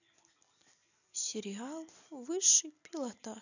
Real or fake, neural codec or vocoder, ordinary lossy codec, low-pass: real; none; none; 7.2 kHz